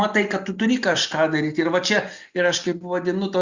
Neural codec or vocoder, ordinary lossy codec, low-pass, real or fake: none; Opus, 64 kbps; 7.2 kHz; real